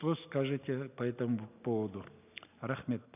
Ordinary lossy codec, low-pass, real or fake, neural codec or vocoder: none; 3.6 kHz; real; none